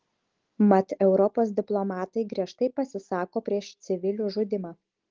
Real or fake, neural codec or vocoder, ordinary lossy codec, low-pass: real; none; Opus, 16 kbps; 7.2 kHz